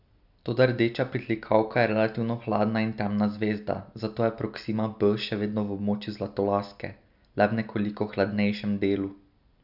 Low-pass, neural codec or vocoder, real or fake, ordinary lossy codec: 5.4 kHz; none; real; none